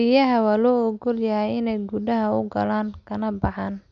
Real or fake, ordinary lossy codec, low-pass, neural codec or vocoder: real; AAC, 64 kbps; 7.2 kHz; none